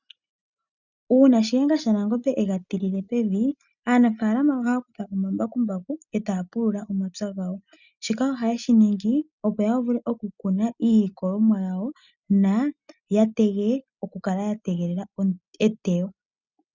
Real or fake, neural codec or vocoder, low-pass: real; none; 7.2 kHz